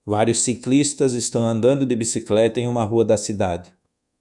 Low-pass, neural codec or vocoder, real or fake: 10.8 kHz; codec, 24 kHz, 1.2 kbps, DualCodec; fake